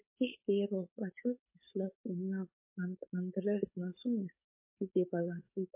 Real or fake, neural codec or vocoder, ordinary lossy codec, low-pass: fake; codec, 16 kHz, 4.8 kbps, FACodec; MP3, 24 kbps; 3.6 kHz